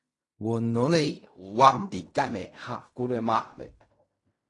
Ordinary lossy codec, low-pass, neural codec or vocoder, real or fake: AAC, 48 kbps; 10.8 kHz; codec, 16 kHz in and 24 kHz out, 0.4 kbps, LongCat-Audio-Codec, fine tuned four codebook decoder; fake